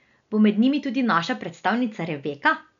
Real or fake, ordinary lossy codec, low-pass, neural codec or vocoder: real; none; 7.2 kHz; none